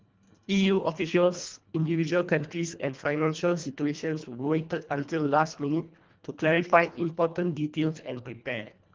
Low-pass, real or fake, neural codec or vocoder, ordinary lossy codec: 7.2 kHz; fake; codec, 24 kHz, 1.5 kbps, HILCodec; Opus, 32 kbps